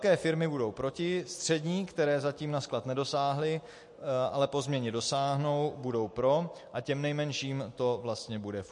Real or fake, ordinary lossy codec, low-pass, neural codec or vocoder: real; MP3, 48 kbps; 10.8 kHz; none